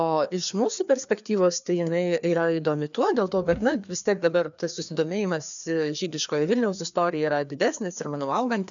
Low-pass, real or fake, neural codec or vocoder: 7.2 kHz; fake; codec, 16 kHz, 2 kbps, FreqCodec, larger model